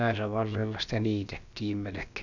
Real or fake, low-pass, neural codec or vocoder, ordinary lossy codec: fake; 7.2 kHz; codec, 16 kHz, about 1 kbps, DyCAST, with the encoder's durations; none